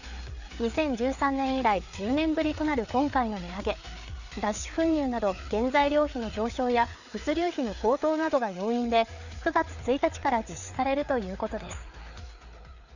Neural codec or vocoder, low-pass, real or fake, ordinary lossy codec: codec, 16 kHz, 4 kbps, FreqCodec, larger model; 7.2 kHz; fake; none